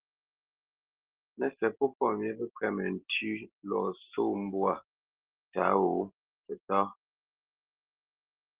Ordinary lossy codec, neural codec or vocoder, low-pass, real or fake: Opus, 16 kbps; none; 3.6 kHz; real